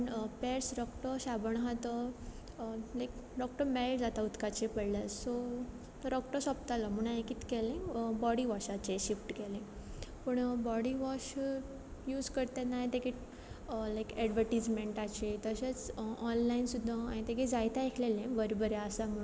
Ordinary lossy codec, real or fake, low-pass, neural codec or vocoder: none; real; none; none